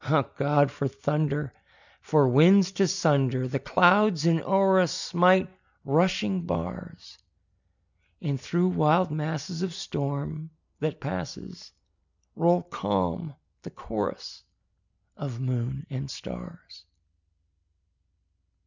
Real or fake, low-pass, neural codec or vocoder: real; 7.2 kHz; none